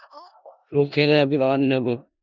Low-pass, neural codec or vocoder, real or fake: 7.2 kHz; codec, 16 kHz in and 24 kHz out, 0.4 kbps, LongCat-Audio-Codec, four codebook decoder; fake